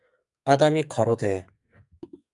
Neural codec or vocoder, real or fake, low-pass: codec, 44.1 kHz, 2.6 kbps, SNAC; fake; 10.8 kHz